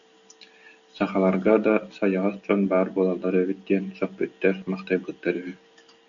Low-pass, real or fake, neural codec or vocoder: 7.2 kHz; real; none